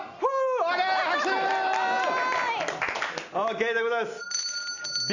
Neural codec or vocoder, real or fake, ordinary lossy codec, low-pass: none; real; none; 7.2 kHz